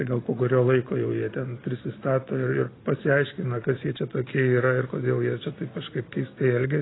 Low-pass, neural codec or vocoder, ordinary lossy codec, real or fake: 7.2 kHz; none; AAC, 16 kbps; real